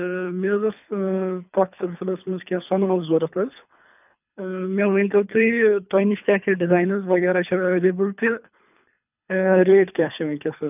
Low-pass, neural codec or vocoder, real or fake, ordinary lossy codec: 3.6 kHz; codec, 24 kHz, 3 kbps, HILCodec; fake; none